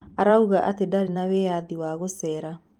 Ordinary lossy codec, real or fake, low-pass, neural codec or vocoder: Opus, 32 kbps; fake; 19.8 kHz; vocoder, 44.1 kHz, 128 mel bands every 256 samples, BigVGAN v2